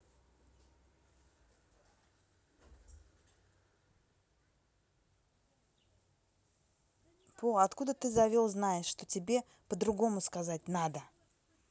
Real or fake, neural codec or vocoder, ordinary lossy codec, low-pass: real; none; none; none